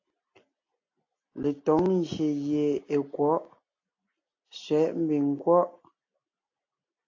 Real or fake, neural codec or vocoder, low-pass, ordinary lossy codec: real; none; 7.2 kHz; AAC, 48 kbps